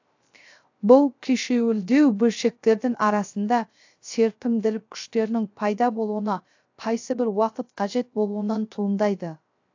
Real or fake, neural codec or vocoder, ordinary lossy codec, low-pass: fake; codec, 16 kHz, 0.3 kbps, FocalCodec; AAC, 48 kbps; 7.2 kHz